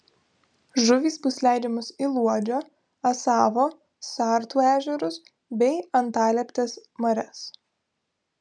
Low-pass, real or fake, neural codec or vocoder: 9.9 kHz; real; none